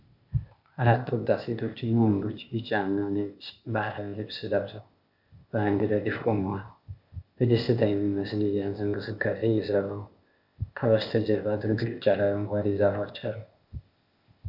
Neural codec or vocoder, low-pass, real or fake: codec, 16 kHz, 0.8 kbps, ZipCodec; 5.4 kHz; fake